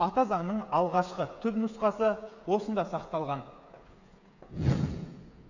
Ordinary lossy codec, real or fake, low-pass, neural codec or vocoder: none; fake; 7.2 kHz; codec, 16 kHz, 8 kbps, FreqCodec, smaller model